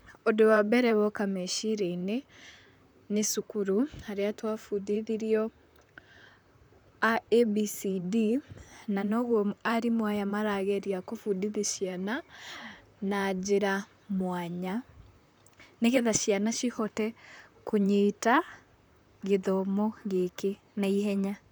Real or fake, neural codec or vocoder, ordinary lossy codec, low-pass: fake; vocoder, 44.1 kHz, 128 mel bands, Pupu-Vocoder; none; none